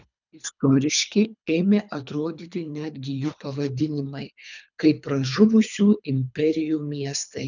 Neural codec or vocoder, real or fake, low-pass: codec, 24 kHz, 3 kbps, HILCodec; fake; 7.2 kHz